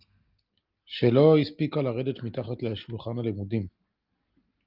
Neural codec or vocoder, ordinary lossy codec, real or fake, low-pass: none; Opus, 32 kbps; real; 5.4 kHz